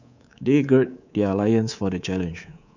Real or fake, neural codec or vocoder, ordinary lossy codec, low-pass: fake; codec, 24 kHz, 3.1 kbps, DualCodec; none; 7.2 kHz